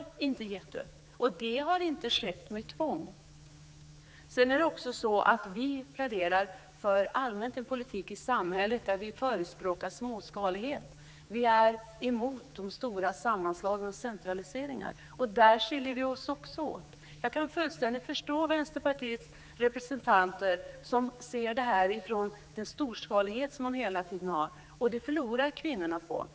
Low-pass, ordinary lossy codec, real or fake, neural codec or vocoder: none; none; fake; codec, 16 kHz, 4 kbps, X-Codec, HuBERT features, trained on general audio